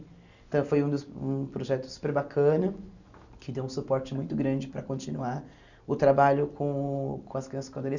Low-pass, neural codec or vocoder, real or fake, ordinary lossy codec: 7.2 kHz; none; real; none